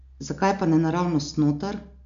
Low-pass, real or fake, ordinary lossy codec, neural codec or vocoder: 7.2 kHz; real; MP3, 64 kbps; none